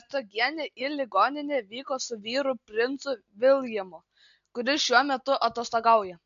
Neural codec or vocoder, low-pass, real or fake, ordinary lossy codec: none; 7.2 kHz; real; AAC, 64 kbps